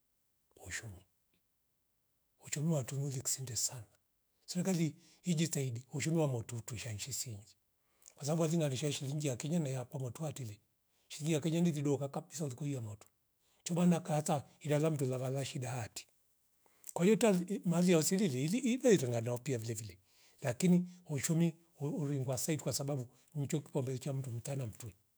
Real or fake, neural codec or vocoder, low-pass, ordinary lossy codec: fake; autoencoder, 48 kHz, 128 numbers a frame, DAC-VAE, trained on Japanese speech; none; none